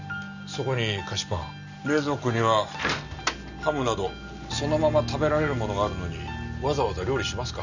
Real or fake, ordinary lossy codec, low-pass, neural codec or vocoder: real; none; 7.2 kHz; none